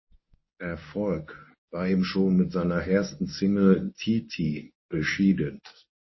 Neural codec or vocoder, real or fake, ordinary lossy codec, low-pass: codec, 24 kHz, 0.9 kbps, WavTokenizer, medium speech release version 1; fake; MP3, 24 kbps; 7.2 kHz